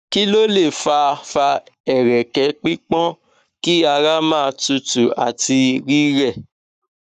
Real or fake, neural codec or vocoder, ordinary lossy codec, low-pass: fake; codec, 44.1 kHz, 7.8 kbps, Pupu-Codec; none; 14.4 kHz